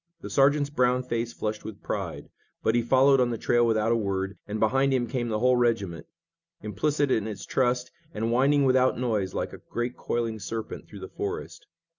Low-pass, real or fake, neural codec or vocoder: 7.2 kHz; real; none